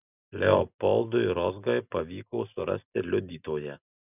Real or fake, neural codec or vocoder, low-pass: real; none; 3.6 kHz